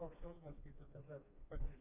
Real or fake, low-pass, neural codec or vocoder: fake; 3.6 kHz; codec, 16 kHz, 2 kbps, FreqCodec, smaller model